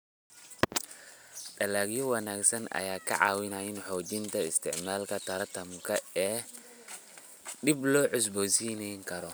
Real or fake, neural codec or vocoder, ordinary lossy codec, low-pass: real; none; none; none